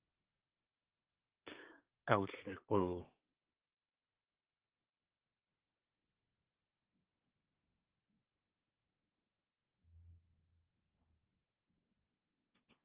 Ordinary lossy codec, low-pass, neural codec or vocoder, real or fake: Opus, 16 kbps; 3.6 kHz; codec, 24 kHz, 1 kbps, SNAC; fake